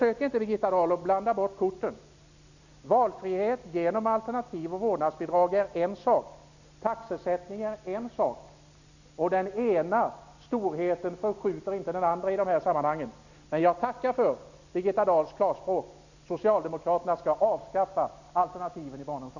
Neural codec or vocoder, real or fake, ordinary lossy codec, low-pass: none; real; none; 7.2 kHz